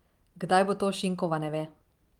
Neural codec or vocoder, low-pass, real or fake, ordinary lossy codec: vocoder, 44.1 kHz, 128 mel bands every 512 samples, BigVGAN v2; 19.8 kHz; fake; Opus, 32 kbps